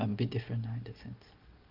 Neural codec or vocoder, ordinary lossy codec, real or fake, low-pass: none; Opus, 32 kbps; real; 5.4 kHz